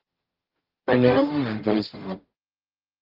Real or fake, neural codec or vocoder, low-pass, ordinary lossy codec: fake; codec, 44.1 kHz, 0.9 kbps, DAC; 5.4 kHz; Opus, 16 kbps